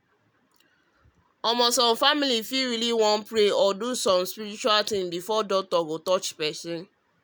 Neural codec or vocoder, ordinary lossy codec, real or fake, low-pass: none; none; real; none